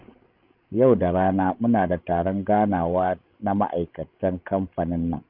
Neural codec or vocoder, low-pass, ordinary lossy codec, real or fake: codec, 16 kHz, 16 kbps, FreqCodec, larger model; 5.4 kHz; none; fake